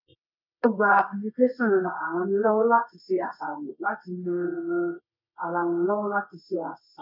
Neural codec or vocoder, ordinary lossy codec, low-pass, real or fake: codec, 24 kHz, 0.9 kbps, WavTokenizer, medium music audio release; none; 5.4 kHz; fake